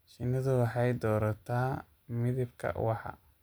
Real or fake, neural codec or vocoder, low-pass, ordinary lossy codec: real; none; none; none